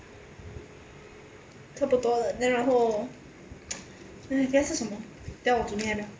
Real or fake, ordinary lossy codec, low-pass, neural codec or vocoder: real; none; none; none